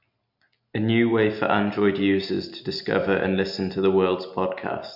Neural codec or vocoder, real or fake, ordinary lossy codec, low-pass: none; real; MP3, 48 kbps; 5.4 kHz